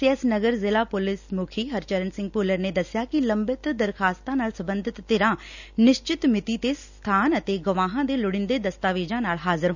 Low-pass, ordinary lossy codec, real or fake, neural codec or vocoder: 7.2 kHz; none; real; none